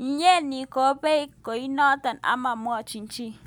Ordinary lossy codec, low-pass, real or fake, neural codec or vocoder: none; none; real; none